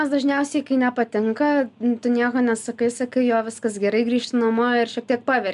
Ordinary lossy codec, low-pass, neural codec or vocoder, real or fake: MP3, 96 kbps; 10.8 kHz; none; real